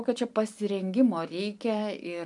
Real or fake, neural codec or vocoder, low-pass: real; none; 10.8 kHz